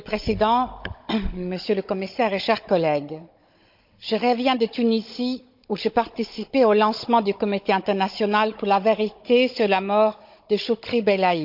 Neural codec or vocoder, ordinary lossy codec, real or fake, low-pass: codec, 16 kHz, 16 kbps, FunCodec, trained on Chinese and English, 50 frames a second; MP3, 48 kbps; fake; 5.4 kHz